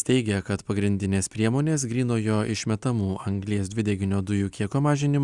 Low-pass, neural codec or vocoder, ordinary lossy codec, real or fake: 10.8 kHz; none; Opus, 64 kbps; real